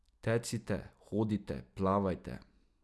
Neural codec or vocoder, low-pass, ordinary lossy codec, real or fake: none; none; none; real